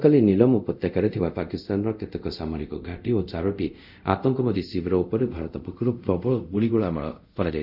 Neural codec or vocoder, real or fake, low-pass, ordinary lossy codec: codec, 24 kHz, 0.5 kbps, DualCodec; fake; 5.4 kHz; none